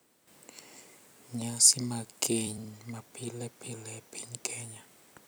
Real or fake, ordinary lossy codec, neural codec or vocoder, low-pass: fake; none; vocoder, 44.1 kHz, 128 mel bands every 256 samples, BigVGAN v2; none